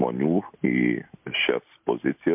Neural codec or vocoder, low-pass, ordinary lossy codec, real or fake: none; 3.6 kHz; MP3, 32 kbps; real